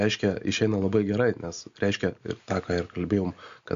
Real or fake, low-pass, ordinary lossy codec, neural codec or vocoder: real; 7.2 kHz; MP3, 48 kbps; none